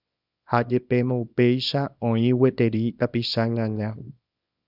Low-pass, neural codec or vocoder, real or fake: 5.4 kHz; codec, 24 kHz, 0.9 kbps, WavTokenizer, small release; fake